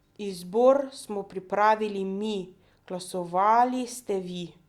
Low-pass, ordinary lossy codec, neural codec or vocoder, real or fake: 19.8 kHz; Opus, 64 kbps; none; real